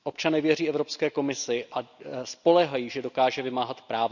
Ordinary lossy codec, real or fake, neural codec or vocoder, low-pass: none; real; none; 7.2 kHz